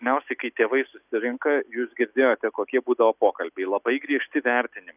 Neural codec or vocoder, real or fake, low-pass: none; real; 3.6 kHz